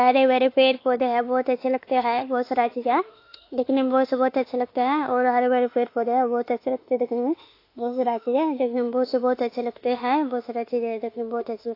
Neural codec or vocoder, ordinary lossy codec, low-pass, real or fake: autoencoder, 48 kHz, 32 numbers a frame, DAC-VAE, trained on Japanese speech; AAC, 32 kbps; 5.4 kHz; fake